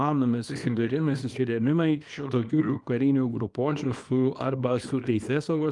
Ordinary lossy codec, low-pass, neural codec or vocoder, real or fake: Opus, 32 kbps; 10.8 kHz; codec, 24 kHz, 0.9 kbps, WavTokenizer, small release; fake